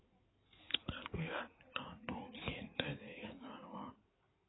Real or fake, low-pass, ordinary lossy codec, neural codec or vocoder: fake; 7.2 kHz; AAC, 16 kbps; codec, 16 kHz in and 24 kHz out, 2.2 kbps, FireRedTTS-2 codec